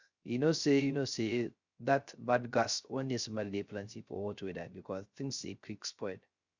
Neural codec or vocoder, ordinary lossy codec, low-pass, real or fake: codec, 16 kHz, 0.3 kbps, FocalCodec; Opus, 64 kbps; 7.2 kHz; fake